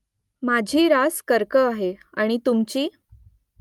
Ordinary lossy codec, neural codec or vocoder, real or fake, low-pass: Opus, 32 kbps; none; real; 19.8 kHz